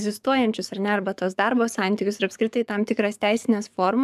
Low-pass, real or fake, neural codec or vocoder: 14.4 kHz; fake; codec, 44.1 kHz, 7.8 kbps, DAC